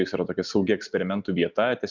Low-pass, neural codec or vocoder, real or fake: 7.2 kHz; none; real